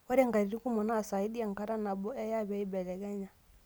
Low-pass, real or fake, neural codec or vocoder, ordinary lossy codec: none; real; none; none